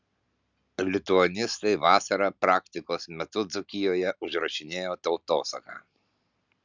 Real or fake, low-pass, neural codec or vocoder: real; 7.2 kHz; none